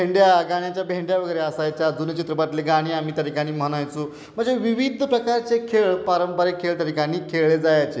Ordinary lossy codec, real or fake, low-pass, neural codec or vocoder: none; real; none; none